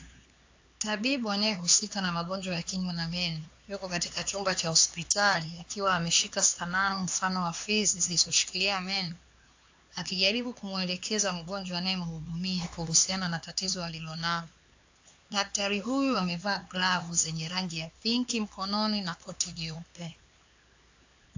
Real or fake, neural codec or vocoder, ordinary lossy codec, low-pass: fake; codec, 16 kHz, 4 kbps, X-Codec, HuBERT features, trained on LibriSpeech; AAC, 48 kbps; 7.2 kHz